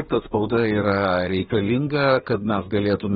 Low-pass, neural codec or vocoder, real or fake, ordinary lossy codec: 14.4 kHz; codec, 32 kHz, 1.9 kbps, SNAC; fake; AAC, 16 kbps